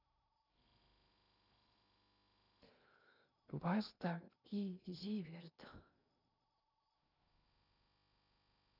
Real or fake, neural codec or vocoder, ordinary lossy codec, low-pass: fake; codec, 16 kHz in and 24 kHz out, 0.8 kbps, FocalCodec, streaming, 65536 codes; none; 5.4 kHz